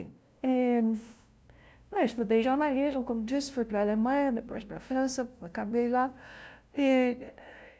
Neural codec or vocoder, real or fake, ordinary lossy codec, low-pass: codec, 16 kHz, 0.5 kbps, FunCodec, trained on LibriTTS, 25 frames a second; fake; none; none